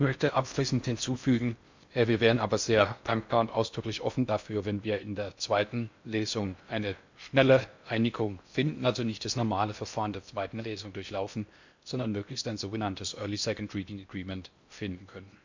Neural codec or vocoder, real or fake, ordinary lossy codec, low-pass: codec, 16 kHz in and 24 kHz out, 0.6 kbps, FocalCodec, streaming, 4096 codes; fake; MP3, 64 kbps; 7.2 kHz